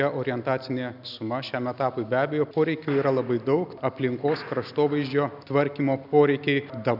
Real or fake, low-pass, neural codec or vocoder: real; 5.4 kHz; none